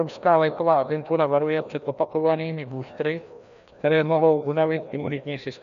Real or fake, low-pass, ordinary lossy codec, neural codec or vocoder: fake; 7.2 kHz; AAC, 96 kbps; codec, 16 kHz, 1 kbps, FreqCodec, larger model